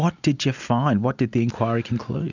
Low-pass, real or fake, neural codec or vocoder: 7.2 kHz; real; none